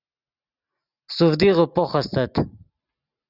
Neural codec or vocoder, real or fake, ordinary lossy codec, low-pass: none; real; Opus, 64 kbps; 5.4 kHz